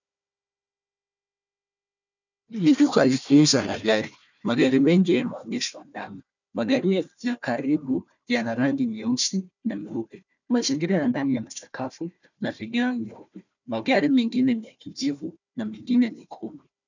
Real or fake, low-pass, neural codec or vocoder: fake; 7.2 kHz; codec, 16 kHz, 1 kbps, FunCodec, trained on Chinese and English, 50 frames a second